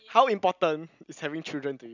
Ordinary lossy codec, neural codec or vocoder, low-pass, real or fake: none; none; 7.2 kHz; real